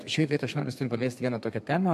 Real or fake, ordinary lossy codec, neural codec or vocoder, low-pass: fake; MP3, 64 kbps; codec, 32 kHz, 1.9 kbps, SNAC; 14.4 kHz